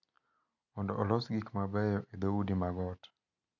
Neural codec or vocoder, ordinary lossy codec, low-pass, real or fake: none; none; 7.2 kHz; real